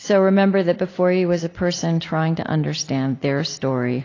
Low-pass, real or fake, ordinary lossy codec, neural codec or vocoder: 7.2 kHz; real; AAC, 32 kbps; none